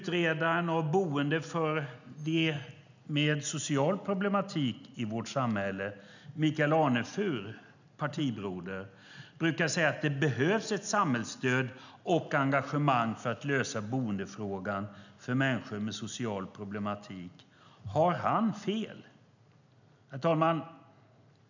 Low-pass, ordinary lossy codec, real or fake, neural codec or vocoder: 7.2 kHz; none; real; none